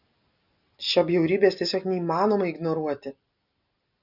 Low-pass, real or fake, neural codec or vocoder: 5.4 kHz; real; none